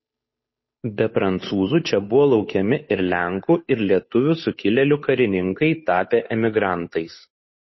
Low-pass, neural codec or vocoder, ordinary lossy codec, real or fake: 7.2 kHz; codec, 16 kHz, 8 kbps, FunCodec, trained on Chinese and English, 25 frames a second; MP3, 24 kbps; fake